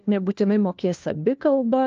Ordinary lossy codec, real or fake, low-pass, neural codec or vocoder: Opus, 24 kbps; fake; 7.2 kHz; codec, 16 kHz, 1 kbps, FunCodec, trained on LibriTTS, 50 frames a second